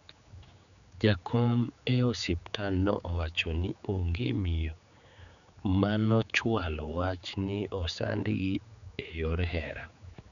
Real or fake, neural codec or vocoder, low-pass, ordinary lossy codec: fake; codec, 16 kHz, 4 kbps, X-Codec, HuBERT features, trained on general audio; 7.2 kHz; none